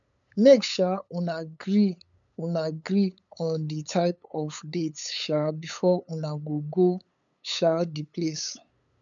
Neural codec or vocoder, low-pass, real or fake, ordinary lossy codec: codec, 16 kHz, 8 kbps, FunCodec, trained on LibriTTS, 25 frames a second; 7.2 kHz; fake; none